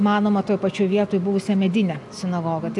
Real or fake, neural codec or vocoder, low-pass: real; none; 10.8 kHz